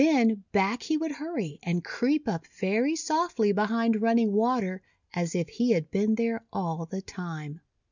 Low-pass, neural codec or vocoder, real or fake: 7.2 kHz; none; real